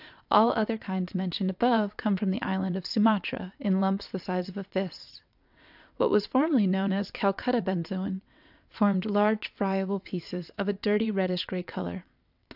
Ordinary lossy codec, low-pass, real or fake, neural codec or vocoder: AAC, 48 kbps; 5.4 kHz; fake; vocoder, 22.05 kHz, 80 mel bands, WaveNeXt